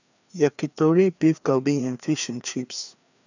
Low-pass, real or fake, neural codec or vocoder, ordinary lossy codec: 7.2 kHz; fake; codec, 16 kHz, 2 kbps, FreqCodec, larger model; none